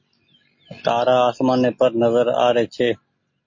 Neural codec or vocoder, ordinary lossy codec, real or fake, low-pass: none; MP3, 32 kbps; real; 7.2 kHz